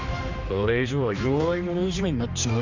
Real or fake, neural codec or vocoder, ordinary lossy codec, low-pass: fake; codec, 16 kHz, 2 kbps, X-Codec, HuBERT features, trained on general audio; none; 7.2 kHz